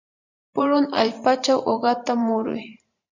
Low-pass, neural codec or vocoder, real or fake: 7.2 kHz; vocoder, 44.1 kHz, 128 mel bands every 512 samples, BigVGAN v2; fake